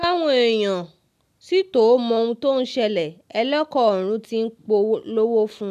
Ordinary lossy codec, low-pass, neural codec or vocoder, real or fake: none; 14.4 kHz; none; real